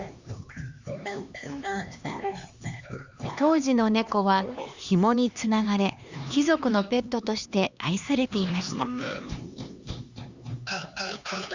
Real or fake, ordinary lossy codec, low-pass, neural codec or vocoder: fake; Opus, 64 kbps; 7.2 kHz; codec, 16 kHz, 2 kbps, X-Codec, HuBERT features, trained on LibriSpeech